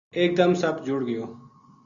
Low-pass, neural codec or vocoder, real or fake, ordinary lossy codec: 7.2 kHz; none; real; Opus, 64 kbps